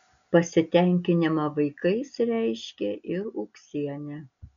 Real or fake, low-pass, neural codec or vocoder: real; 7.2 kHz; none